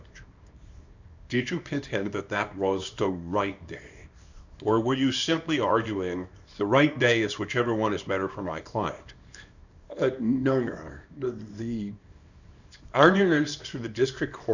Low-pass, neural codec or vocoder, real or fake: 7.2 kHz; codec, 24 kHz, 0.9 kbps, WavTokenizer, small release; fake